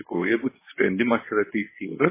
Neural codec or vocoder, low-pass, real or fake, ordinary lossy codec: codec, 24 kHz, 0.9 kbps, WavTokenizer, medium speech release version 1; 3.6 kHz; fake; MP3, 16 kbps